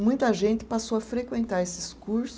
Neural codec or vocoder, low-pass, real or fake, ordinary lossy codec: none; none; real; none